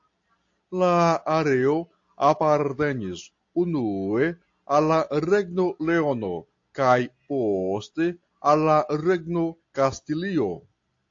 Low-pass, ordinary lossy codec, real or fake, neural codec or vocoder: 7.2 kHz; AAC, 48 kbps; real; none